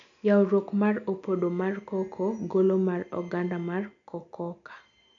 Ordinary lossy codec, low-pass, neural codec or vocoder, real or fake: MP3, 64 kbps; 7.2 kHz; none; real